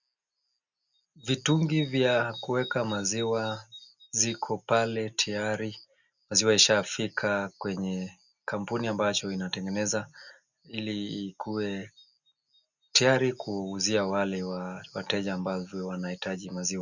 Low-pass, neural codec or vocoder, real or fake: 7.2 kHz; none; real